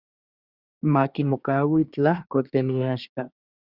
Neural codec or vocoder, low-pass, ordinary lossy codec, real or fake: codec, 24 kHz, 1 kbps, SNAC; 5.4 kHz; Opus, 64 kbps; fake